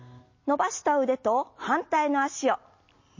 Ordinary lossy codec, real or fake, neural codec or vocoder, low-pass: MP3, 32 kbps; real; none; 7.2 kHz